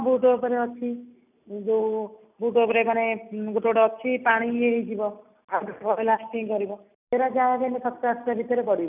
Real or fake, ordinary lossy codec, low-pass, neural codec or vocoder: real; none; 3.6 kHz; none